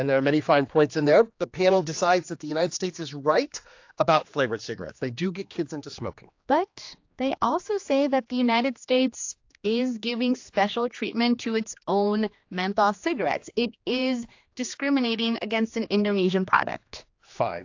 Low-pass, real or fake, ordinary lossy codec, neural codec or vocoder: 7.2 kHz; fake; AAC, 48 kbps; codec, 16 kHz, 2 kbps, X-Codec, HuBERT features, trained on general audio